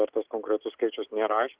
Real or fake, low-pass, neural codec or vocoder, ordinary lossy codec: real; 3.6 kHz; none; Opus, 16 kbps